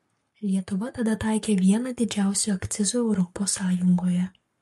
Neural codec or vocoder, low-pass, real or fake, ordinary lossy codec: codec, 44.1 kHz, 7.8 kbps, Pupu-Codec; 14.4 kHz; fake; MP3, 64 kbps